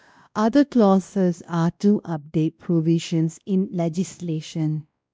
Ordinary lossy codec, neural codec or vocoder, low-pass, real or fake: none; codec, 16 kHz, 1 kbps, X-Codec, WavLM features, trained on Multilingual LibriSpeech; none; fake